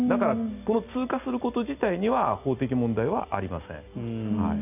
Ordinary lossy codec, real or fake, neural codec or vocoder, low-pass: none; real; none; 3.6 kHz